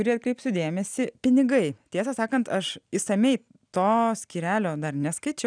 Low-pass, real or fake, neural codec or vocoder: 9.9 kHz; real; none